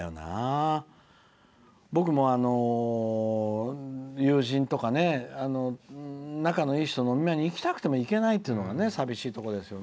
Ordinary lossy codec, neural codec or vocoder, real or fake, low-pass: none; none; real; none